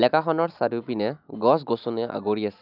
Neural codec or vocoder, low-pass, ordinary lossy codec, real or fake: none; 5.4 kHz; none; real